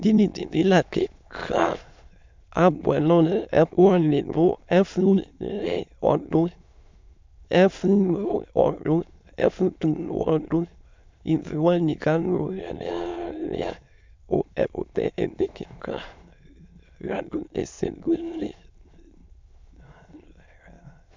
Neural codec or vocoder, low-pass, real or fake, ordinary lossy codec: autoencoder, 22.05 kHz, a latent of 192 numbers a frame, VITS, trained on many speakers; 7.2 kHz; fake; MP3, 64 kbps